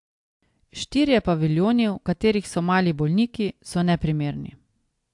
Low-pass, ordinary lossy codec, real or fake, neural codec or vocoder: 10.8 kHz; AAC, 64 kbps; real; none